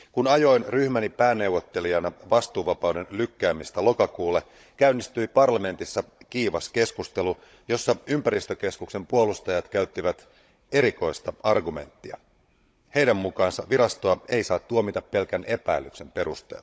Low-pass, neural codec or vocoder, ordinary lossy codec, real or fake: none; codec, 16 kHz, 16 kbps, FunCodec, trained on Chinese and English, 50 frames a second; none; fake